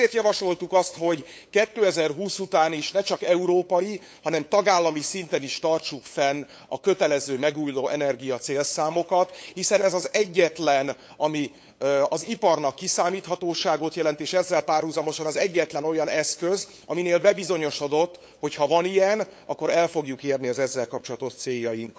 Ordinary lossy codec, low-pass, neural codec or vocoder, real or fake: none; none; codec, 16 kHz, 8 kbps, FunCodec, trained on LibriTTS, 25 frames a second; fake